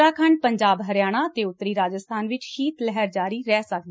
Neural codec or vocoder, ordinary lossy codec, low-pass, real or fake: none; none; none; real